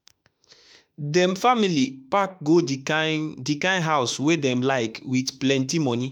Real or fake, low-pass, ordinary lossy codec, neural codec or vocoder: fake; 19.8 kHz; none; autoencoder, 48 kHz, 32 numbers a frame, DAC-VAE, trained on Japanese speech